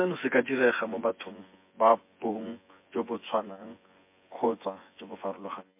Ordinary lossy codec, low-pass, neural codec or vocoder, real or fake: MP3, 24 kbps; 3.6 kHz; vocoder, 24 kHz, 100 mel bands, Vocos; fake